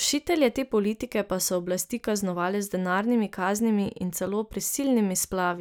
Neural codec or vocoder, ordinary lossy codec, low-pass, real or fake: none; none; none; real